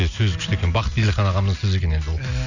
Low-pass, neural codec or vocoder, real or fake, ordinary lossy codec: 7.2 kHz; none; real; none